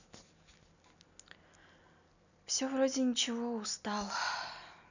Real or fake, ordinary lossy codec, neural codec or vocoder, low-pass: real; none; none; 7.2 kHz